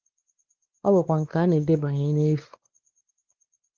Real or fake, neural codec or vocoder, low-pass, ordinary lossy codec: fake; codec, 16 kHz, 2 kbps, X-Codec, WavLM features, trained on Multilingual LibriSpeech; 7.2 kHz; Opus, 16 kbps